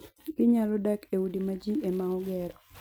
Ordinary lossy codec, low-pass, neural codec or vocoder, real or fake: none; none; none; real